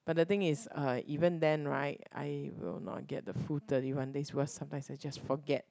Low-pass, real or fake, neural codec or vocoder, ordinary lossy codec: none; real; none; none